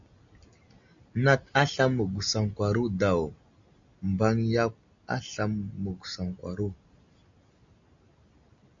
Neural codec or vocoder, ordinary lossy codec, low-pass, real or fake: none; AAC, 64 kbps; 7.2 kHz; real